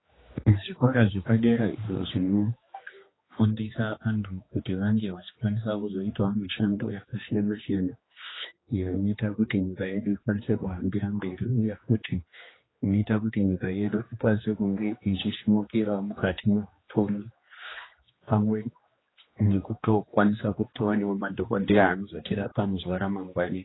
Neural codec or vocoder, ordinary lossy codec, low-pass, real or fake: codec, 16 kHz, 2 kbps, X-Codec, HuBERT features, trained on general audio; AAC, 16 kbps; 7.2 kHz; fake